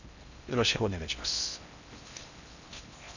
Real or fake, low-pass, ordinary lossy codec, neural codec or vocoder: fake; 7.2 kHz; none; codec, 16 kHz in and 24 kHz out, 0.6 kbps, FocalCodec, streaming, 4096 codes